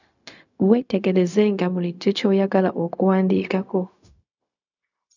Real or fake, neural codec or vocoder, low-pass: fake; codec, 16 kHz, 0.4 kbps, LongCat-Audio-Codec; 7.2 kHz